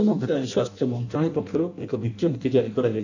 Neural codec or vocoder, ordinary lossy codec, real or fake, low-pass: codec, 16 kHz in and 24 kHz out, 0.6 kbps, FireRedTTS-2 codec; none; fake; 7.2 kHz